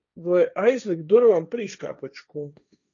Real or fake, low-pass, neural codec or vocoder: fake; 7.2 kHz; codec, 16 kHz, 1.1 kbps, Voila-Tokenizer